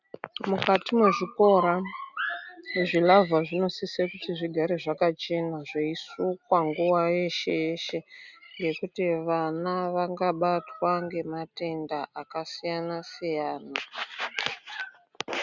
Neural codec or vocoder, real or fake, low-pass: none; real; 7.2 kHz